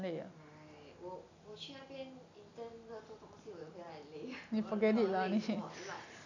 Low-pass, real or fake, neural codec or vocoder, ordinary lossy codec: 7.2 kHz; real; none; none